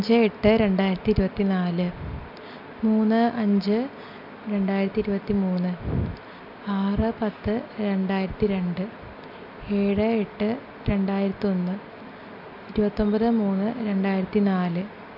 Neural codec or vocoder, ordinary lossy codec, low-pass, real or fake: none; none; 5.4 kHz; real